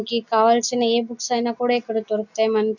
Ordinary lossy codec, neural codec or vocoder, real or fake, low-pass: none; none; real; 7.2 kHz